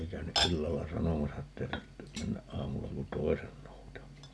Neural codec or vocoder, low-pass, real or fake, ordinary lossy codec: none; none; real; none